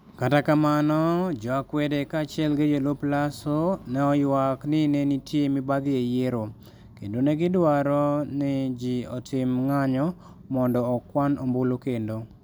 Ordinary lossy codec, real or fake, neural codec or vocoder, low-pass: none; real; none; none